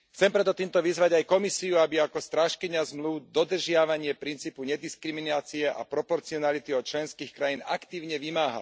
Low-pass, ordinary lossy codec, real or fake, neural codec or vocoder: none; none; real; none